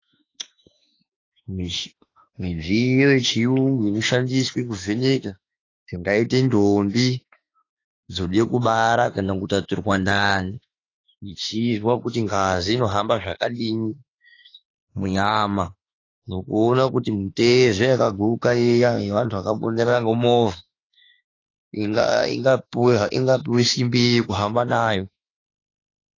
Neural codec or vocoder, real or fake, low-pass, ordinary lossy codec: autoencoder, 48 kHz, 32 numbers a frame, DAC-VAE, trained on Japanese speech; fake; 7.2 kHz; AAC, 32 kbps